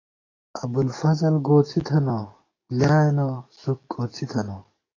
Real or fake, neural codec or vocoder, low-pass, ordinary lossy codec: fake; codec, 44.1 kHz, 7.8 kbps, DAC; 7.2 kHz; AAC, 32 kbps